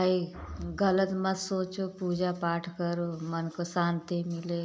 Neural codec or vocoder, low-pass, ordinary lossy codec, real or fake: none; none; none; real